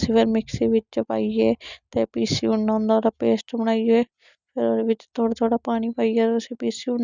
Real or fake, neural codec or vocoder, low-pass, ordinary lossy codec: real; none; 7.2 kHz; none